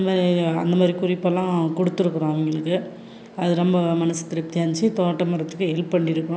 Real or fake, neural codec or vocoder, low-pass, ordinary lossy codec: real; none; none; none